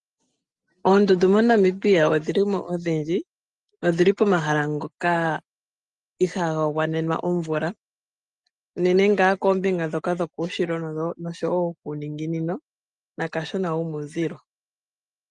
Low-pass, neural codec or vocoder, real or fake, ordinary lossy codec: 10.8 kHz; none; real; Opus, 32 kbps